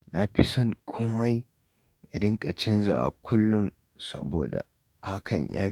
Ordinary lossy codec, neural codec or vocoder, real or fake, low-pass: none; codec, 44.1 kHz, 2.6 kbps, DAC; fake; 19.8 kHz